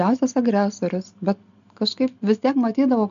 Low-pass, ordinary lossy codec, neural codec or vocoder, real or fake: 7.2 kHz; MP3, 64 kbps; none; real